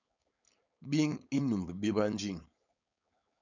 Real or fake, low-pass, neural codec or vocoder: fake; 7.2 kHz; codec, 16 kHz, 4.8 kbps, FACodec